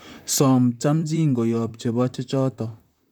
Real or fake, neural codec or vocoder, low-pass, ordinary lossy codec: fake; vocoder, 44.1 kHz, 128 mel bands, Pupu-Vocoder; 19.8 kHz; none